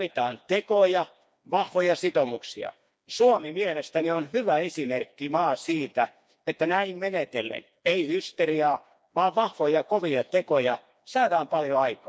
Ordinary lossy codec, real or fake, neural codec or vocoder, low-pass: none; fake; codec, 16 kHz, 2 kbps, FreqCodec, smaller model; none